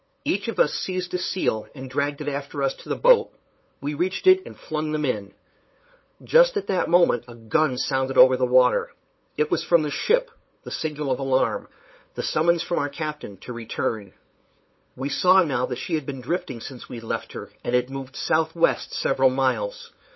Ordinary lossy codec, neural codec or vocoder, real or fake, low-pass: MP3, 24 kbps; codec, 16 kHz, 8 kbps, FunCodec, trained on LibriTTS, 25 frames a second; fake; 7.2 kHz